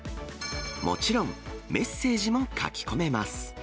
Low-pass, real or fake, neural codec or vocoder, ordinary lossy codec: none; real; none; none